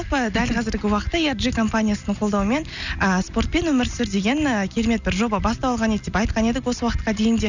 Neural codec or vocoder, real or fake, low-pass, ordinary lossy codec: none; real; 7.2 kHz; none